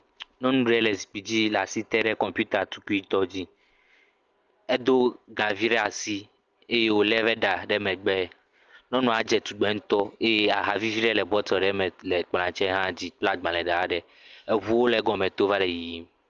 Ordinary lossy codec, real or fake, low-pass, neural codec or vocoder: Opus, 24 kbps; real; 7.2 kHz; none